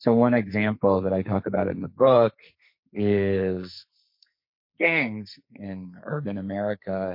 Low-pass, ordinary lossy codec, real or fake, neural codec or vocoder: 5.4 kHz; MP3, 32 kbps; fake; codec, 44.1 kHz, 2.6 kbps, SNAC